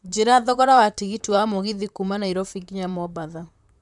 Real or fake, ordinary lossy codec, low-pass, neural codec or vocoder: fake; none; 10.8 kHz; vocoder, 44.1 kHz, 128 mel bands, Pupu-Vocoder